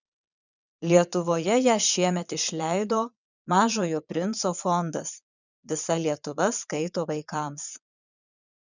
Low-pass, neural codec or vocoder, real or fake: 7.2 kHz; vocoder, 22.05 kHz, 80 mel bands, Vocos; fake